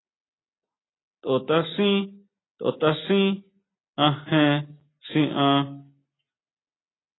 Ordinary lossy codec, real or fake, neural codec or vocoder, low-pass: AAC, 16 kbps; real; none; 7.2 kHz